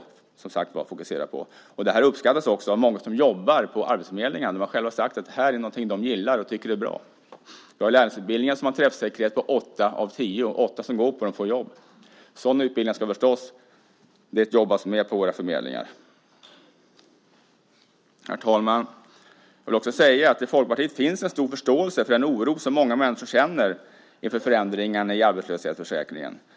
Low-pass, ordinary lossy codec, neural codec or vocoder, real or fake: none; none; none; real